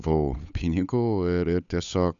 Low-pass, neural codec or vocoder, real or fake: 7.2 kHz; codec, 16 kHz, 8 kbps, FunCodec, trained on Chinese and English, 25 frames a second; fake